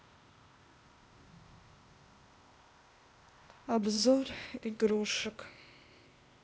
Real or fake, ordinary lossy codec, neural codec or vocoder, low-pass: fake; none; codec, 16 kHz, 0.8 kbps, ZipCodec; none